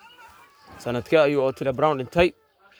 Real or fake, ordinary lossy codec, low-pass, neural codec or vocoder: fake; none; none; codec, 44.1 kHz, 7.8 kbps, Pupu-Codec